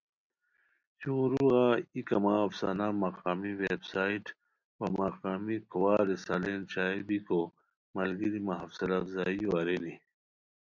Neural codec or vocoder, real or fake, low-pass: none; real; 7.2 kHz